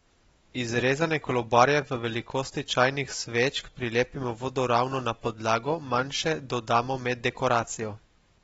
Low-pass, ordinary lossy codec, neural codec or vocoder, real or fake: 19.8 kHz; AAC, 24 kbps; none; real